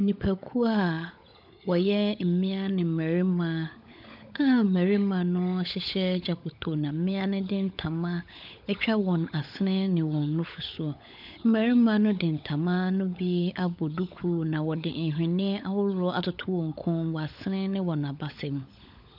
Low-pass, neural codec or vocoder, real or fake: 5.4 kHz; codec, 16 kHz, 16 kbps, FunCodec, trained on LibriTTS, 50 frames a second; fake